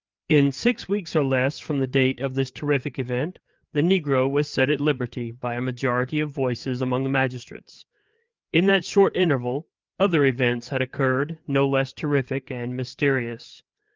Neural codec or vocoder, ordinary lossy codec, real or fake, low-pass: codec, 16 kHz, 4 kbps, FreqCodec, larger model; Opus, 24 kbps; fake; 7.2 kHz